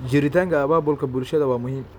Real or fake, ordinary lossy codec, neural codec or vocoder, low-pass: real; none; none; 19.8 kHz